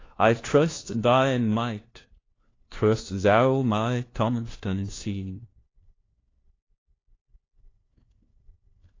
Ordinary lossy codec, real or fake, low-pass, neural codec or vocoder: AAC, 32 kbps; fake; 7.2 kHz; codec, 16 kHz, 1 kbps, FunCodec, trained on LibriTTS, 50 frames a second